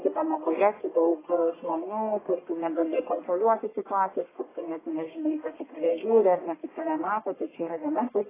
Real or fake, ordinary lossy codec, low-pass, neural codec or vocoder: fake; AAC, 16 kbps; 3.6 kHz; codec, 44.1 kHz, 1.7 kbps, Pupu-Codec